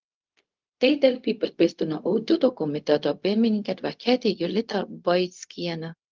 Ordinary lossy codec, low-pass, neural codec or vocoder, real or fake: Opus, 32 kbps; 7.2 kHz; codec, 16 kHz, 0.4 kbps, LongCat-Audio-Codec; fake